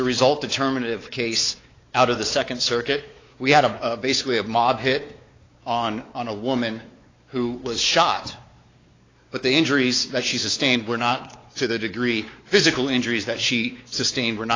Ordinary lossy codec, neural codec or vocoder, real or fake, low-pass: AAC, 32 kbps; codec, 16 kHz, 4 kbps, X-Codec, WavLM features, trained on Multilingual LibriSpeech; fake; 7.2 kHz